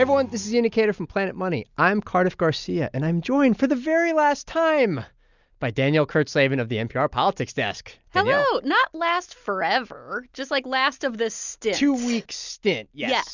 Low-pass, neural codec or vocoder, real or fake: 7.2 kHz; none; real